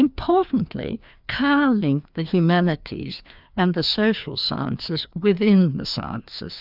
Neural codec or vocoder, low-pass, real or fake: codec, 16 kHz, 2 kbps, FreqCodec, larger model; 5.4 kHz; fake